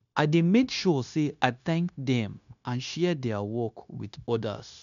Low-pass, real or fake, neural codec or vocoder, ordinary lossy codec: 7.2 kHz; fake; codec, 16 kHz, 0.9 kbps, LongCat-Audio-Codec; none